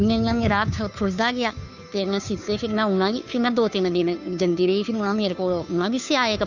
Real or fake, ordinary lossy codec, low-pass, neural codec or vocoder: fake; none; 7.2 kHz; codec, 16 kHz, 2 kbps, FunCodec, trained on Chinese and English, 25 frames a second